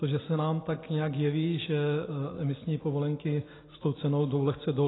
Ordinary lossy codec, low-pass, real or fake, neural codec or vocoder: AAC, 16 kbps; 7.2 kHz; real; none